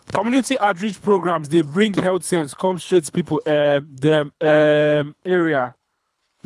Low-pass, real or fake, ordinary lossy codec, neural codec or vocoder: none; fake; none; codec, 24 kHz, 3 kbps, HILCodec